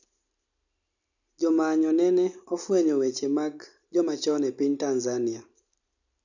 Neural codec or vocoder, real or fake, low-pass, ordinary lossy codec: none; real; 7.2 kHz; none